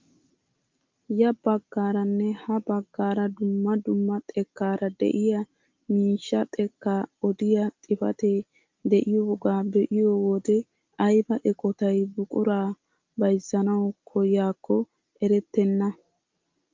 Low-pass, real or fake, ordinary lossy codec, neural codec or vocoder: 7.2 kHz; real; Opus, 24 kbps; none